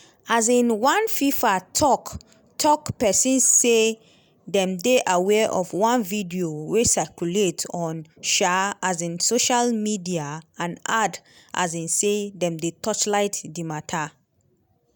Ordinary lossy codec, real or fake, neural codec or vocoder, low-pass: none; real; none; none